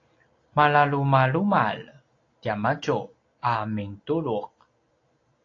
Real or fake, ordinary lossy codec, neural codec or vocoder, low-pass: real; AAC, 32 kbps; none; 7.2 kHz